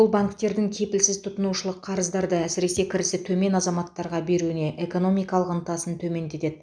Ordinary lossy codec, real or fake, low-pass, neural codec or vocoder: none; real; 9.9 kHz; none